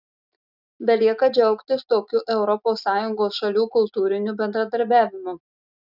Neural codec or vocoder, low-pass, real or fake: none; 5.4 kHz; real